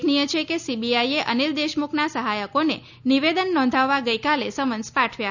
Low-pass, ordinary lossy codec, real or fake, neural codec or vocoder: 7.2 kHz; none; real; none